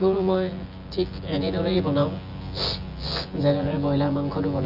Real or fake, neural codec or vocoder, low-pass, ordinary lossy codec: fake; vocoder, 24 kHz, 100 mel bands, Vocos; 5.4 kHz; Opus, 32 kbps